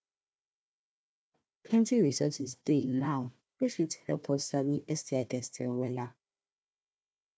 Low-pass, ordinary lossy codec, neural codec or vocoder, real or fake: none; none; codec, 16 kHz, 1 kbps, FunCodec, trained on Chinese and English, 50 frames a second; fake